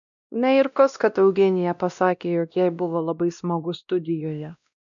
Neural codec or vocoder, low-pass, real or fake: codec, 16 kHz, 1 kbps, X-Codec, WavLM features, trained on Multilingual LibriSpeech; 7.2 kHz; fake